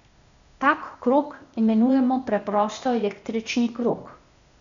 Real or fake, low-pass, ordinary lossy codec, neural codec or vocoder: fake; 7.2 kHz; MP3, 96 kbps; codec, 16 kHz, 0.8 kbps, ZipCodec